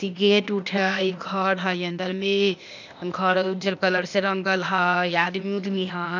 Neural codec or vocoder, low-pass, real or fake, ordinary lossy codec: codec, 16 kHz, 0.8 kbps, ZipCodec; 7.2 kHz; fake; none